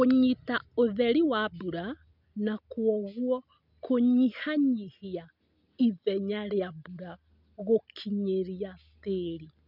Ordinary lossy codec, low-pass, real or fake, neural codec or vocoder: none; 5.4 kHz; real; none